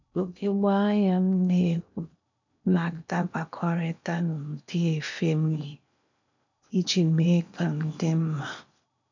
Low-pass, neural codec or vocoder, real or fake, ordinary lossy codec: 7.2 kHz; codec, 16 kHz in and 24 kHz out, 0.8 kbps, FocalCodec, streaming, 65536 codes; fake; none